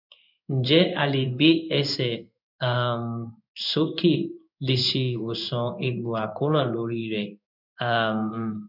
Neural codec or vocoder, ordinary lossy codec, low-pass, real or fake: codec, 16 kHz in and 24 kHz out, 1 kbps, XY-Tokenizer; none; 5.4 kHz; fake